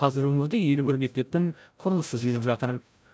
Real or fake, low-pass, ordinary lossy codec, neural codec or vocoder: fake; none; none; codec, 16 kHz, 0.5 kbps, FreqCodec, larger model